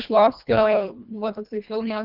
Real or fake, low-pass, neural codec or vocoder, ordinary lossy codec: fake; 5.4 kHz; codec, 24 kHz, 1.5 kbps, HILCodec; Opus, 24 kbps